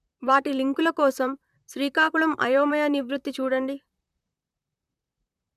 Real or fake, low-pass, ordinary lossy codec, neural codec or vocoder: fake; 14.4 kHz; none; vocoder, 44.1 kHz, 128 mel bands, Pupu-Vocoder